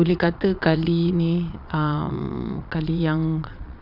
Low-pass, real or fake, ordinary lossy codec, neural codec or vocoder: 5.4 kHz; fake; none; vocoder, 22.05 kHz, 80 mel bands, Vocos